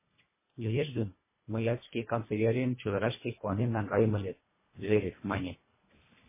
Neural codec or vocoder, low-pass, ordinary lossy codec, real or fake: codec, 24 kHz, 1.5 kbps, HILCodec; 3.6 kHz; MP3, 16 kbps; fake